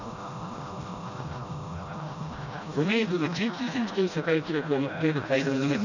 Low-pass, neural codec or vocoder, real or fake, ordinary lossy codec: 7.2 kHz; codec, 16 kHz, 1 kbps, FreqCodec, smaller model; fake; none